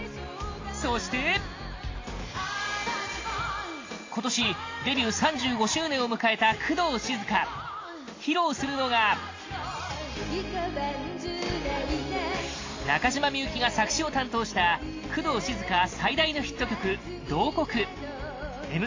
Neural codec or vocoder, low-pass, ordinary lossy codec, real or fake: none; 7.2 kHz; AAC, 32 kbps; real